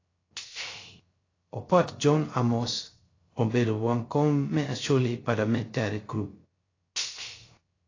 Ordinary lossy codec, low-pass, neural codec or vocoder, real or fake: AAC, 32 kbps; 7.2 kHz; codec, 16 kHz, 0.3 kbps, FocalCodec; fake